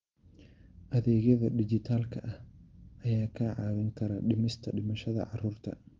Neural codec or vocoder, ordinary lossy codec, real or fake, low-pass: none; Opus, 32 kbps; real; 7.2 kHz